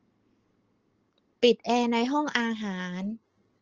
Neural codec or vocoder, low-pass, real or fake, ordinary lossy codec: none; 7.2 kHz; real; Opus, 16 kbps